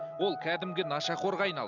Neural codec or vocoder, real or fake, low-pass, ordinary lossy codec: none; real; 7.2 kHz; none